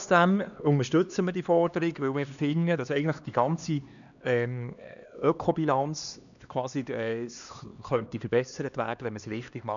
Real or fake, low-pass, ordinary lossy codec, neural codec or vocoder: fake; 7.2 kHz; none; codec, 16 kHz, 2 kbps, X-Codec, HuBERT features, trained on LibriSpeech